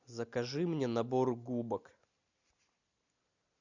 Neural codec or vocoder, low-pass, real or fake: none; 7.2 kHz; real